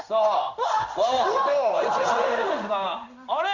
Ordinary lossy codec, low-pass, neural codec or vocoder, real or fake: none; 7.2 kHz; codec, 16 kHz in and 24 kHz out, 1 kbps, XY-Tokenizer; fake